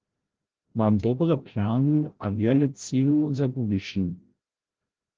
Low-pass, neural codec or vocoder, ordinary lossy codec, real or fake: 7.2 kHz; codec, 16 kHz, 0.5 kbps, FreqCodec, larger model; Opus, 16 kbps; fake